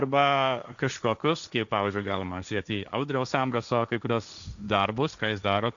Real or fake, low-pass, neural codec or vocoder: fake; 7.2 kHz; codec, 16 kHz, 1.1 kbps, Voila-Tokenizer